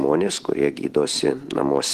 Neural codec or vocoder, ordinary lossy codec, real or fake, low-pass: none; Opus, 16 kbps; real; 14.4 kHz